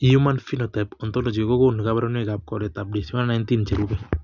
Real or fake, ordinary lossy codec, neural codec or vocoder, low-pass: real; none; none; 7.2 kHz